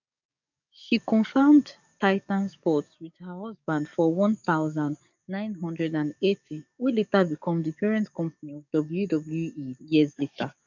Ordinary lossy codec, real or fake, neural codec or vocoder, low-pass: none; fake; codec, 44.1 kHz, 7.8 kbps, DAC; 7.2 kHz